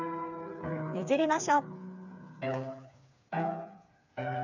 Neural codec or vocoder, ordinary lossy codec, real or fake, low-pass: codec, 16 kHz, 4 kbps, FreqCodec, smaller model; none; fake; 7.2 kHz